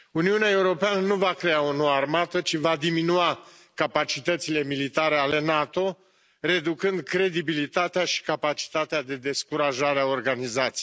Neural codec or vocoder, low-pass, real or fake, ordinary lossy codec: none; none; real; none